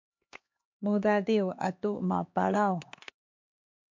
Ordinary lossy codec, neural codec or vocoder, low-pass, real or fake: MP3, 48 kbps; codec, 16 kHz, 2 kbps, X-Codec, HuBERT features, trained on LibriSpeech; 7.2 kHz; fake